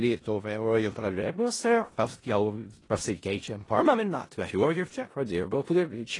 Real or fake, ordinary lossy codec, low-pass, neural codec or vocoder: fake; AAC, 32 kbps; 10.8 kHz; codec, 16 kHz in and 24 kHz out, 0.4 kbps, LongCat-Audio-Codec, four codebook decoder